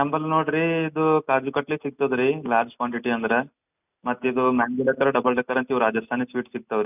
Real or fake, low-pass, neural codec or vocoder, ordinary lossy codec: real; 3.6 kHz; none; none